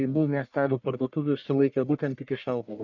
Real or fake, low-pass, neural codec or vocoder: fake; 7.2 kHz; codec, 44.1 kHz, 1.7 kbps, Pupu-Codec